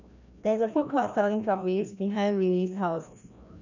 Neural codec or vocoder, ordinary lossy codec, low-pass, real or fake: codec, 16 kHz, 1 kbps, FreqCodec, larger model; none; 7.2 kHz; fake